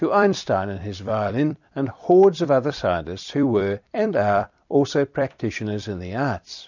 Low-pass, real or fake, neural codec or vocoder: 7.2 kHz; fake; vocoder, 44.1 kHz, 128 mel bands every 256 samples, BigVGAN v2